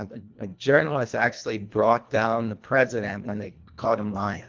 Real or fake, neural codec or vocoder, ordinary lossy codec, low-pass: fake; codec, 24 kHz, 1.5 kbps, HILCodec; Opus, 32 kbps; 7.2 kHz